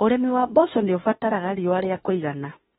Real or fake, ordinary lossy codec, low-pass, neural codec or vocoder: fake; AAC, 16 kbps; 19.8 kHz; autoencoder, 48 kHz, 32 numbers a frame, DAC-VAE, trained on Japanese speech